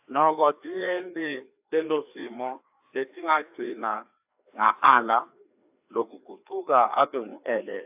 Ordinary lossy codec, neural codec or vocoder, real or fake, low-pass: AAC, 32 kbps; codec, 16 kHz, 2 kbps, FreqCodec, larger model; fake; 3.6 kHz